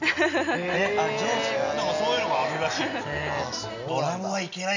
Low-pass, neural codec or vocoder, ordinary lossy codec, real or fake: 7.2 kHz; none; none; real